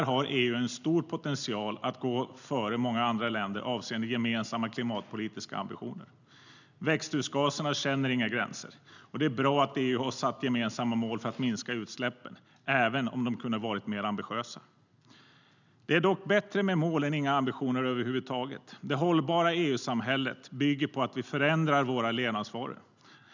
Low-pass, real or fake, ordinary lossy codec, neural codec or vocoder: 7.2 kHz; real; none; none